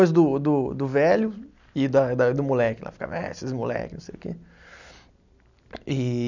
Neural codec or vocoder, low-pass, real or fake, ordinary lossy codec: none; 7.2 kHz; real; none